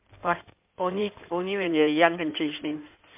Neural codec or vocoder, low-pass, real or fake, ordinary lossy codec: codec, 16 kHz in and 24 kHz out, 2.2 kbps, FireRedTTS-2 codec; 3.6 kHz; fake; MP3, 32 kbps